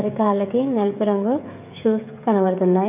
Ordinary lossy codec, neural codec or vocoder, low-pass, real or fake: none; codec, 16 kHz, 16 kbps, FreqCodec, smaller model; 3.6 kHz; fake